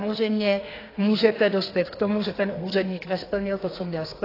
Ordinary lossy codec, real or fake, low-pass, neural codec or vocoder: AAC, 24 kbps; fake; 5.4 kHz; codec, 32 kHz, 1.9 kbps, SNAC